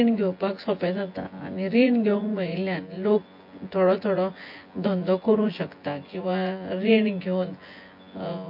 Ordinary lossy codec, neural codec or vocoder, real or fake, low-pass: MP3, 32 kbps; vocoder, 24 kHz, 100 mel bands, Vocos; fake; 5.4 kHz